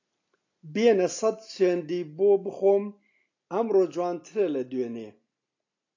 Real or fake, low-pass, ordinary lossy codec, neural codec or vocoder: real; 7.2 kHz; AAC, 48 kbps; none